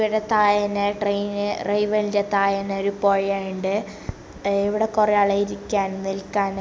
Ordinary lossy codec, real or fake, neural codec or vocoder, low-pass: none; real; none; none